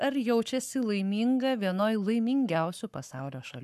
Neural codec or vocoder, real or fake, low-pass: codec, 44.1 kHz, 7.8 kbps, Pupu-Codec; fake; 14.4 kHz